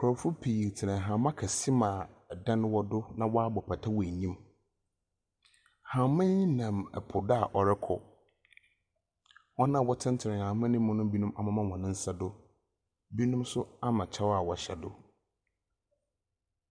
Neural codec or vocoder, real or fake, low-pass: none; real; 9.9 kHz